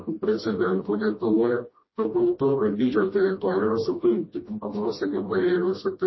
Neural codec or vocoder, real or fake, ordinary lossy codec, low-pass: codec, 16 kHz, 1 kbps, FreqCodec, smaller model; fake; MP3, 24 kbps; 7.2 kHz